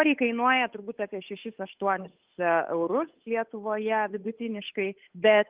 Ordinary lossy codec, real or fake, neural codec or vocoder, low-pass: Opus, 32 kbps; fake; codec, 16 kHz, 8 kbps, FunCodec, trained on Chinese and English, 25 frames a second; 3.6 kHz